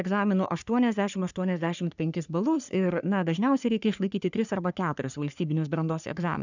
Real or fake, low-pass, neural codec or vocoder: fake; 7.2 kHz; codec, 44.1 kHz, 3.4 kbps, Pupu-Codec